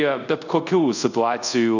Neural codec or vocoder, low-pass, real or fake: codec, 24 kHz, 0.5 kbps, DualCodec; 7.2 kHz; fake